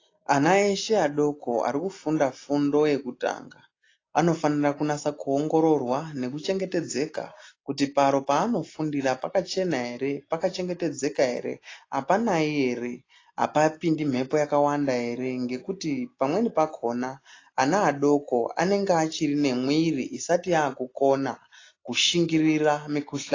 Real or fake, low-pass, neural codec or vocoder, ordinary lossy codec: real; 7.2 kHz; none; AAC, 32 kbps